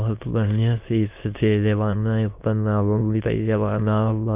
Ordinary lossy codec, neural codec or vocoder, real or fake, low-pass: Opus, 24 kbps; autoencoder, 22.05 kHz, a latent of 192 numbers a frame, VITS, trained on many speakers; fake; 3.6 kHz